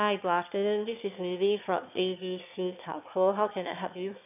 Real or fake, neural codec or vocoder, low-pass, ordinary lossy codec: fake; autoencoder, 22.05 kHz, a latent of 192 numbers a frame, VITS, trained on one speaker; 3.6 kHz; none